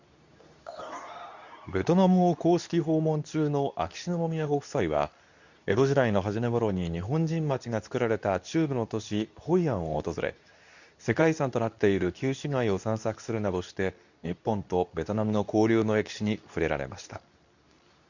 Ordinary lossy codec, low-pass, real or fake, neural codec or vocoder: none; 7.2 kHz; fake; codec, 24 kHz, 0.9 kbps, WavTokenizer, medium speech release version 2